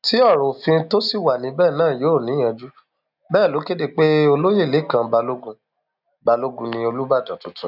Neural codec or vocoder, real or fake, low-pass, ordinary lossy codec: none; real; 5.4 kHz; none